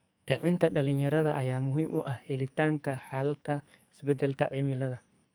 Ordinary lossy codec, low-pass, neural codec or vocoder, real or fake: none; none; codec, 44.1 kHz, 2.6 kbps, SNAC; fake